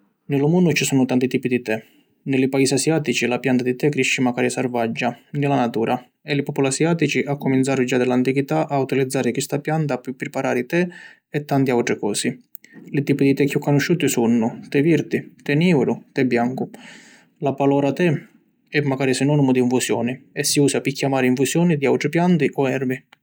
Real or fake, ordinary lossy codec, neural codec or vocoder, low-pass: real; none; none; none